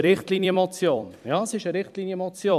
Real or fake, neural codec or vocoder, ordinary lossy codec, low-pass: fake; vocoder, 44.1 kHz, 128 mel bands every 256 samples, BigVGAN v2; none; 14.4 kHz